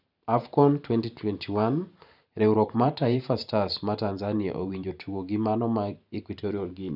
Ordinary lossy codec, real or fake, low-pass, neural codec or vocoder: none; fake; 5.4 kHz; vocoder, 44.1 kHz, 128 mel bands every 512 samples, BigVGAN v2